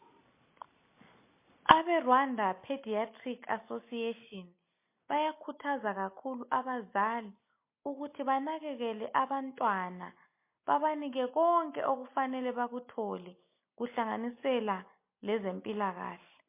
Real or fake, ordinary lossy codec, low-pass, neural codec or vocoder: real; MP3, 24 kbps; 3.6 kHz; none